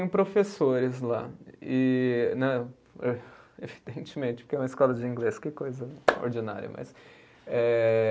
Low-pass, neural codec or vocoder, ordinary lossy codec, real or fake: none; none; none; real